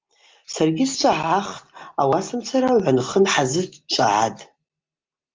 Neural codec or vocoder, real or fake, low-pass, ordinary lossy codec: none; real; 7.2 kHz; Opus, 32 kbps